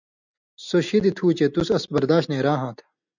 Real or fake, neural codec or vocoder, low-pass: real; none; 7.2 kHz